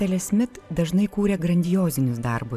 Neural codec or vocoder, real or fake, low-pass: vocoder, 44.1 kHz, 128 mel bands every 512 samples, BigVGAN v2; fake; 14.4 kHz